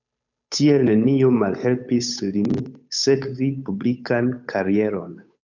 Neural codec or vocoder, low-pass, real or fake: codec, 16 kHz, 8 kbps, FunCodec, trained on Chinese and English, 25 frames a second; 7.2 kHz; fake